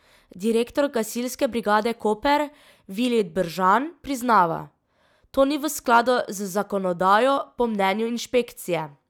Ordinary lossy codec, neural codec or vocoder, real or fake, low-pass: none; none; real; 19.8 kHz